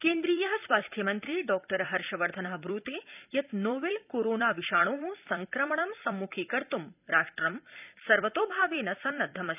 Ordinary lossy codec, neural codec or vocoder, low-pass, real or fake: none; vocoder, 44.1 kHz, 128 mel bands every 256 samples, BigVGAN v2; 3.6 kHz; fake